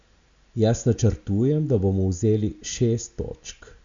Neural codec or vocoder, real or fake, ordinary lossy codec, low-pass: none; real; Opus, 64 kbps; 7.2 kHz